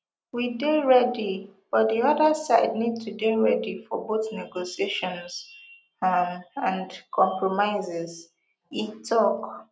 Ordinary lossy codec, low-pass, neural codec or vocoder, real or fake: none; none; none; real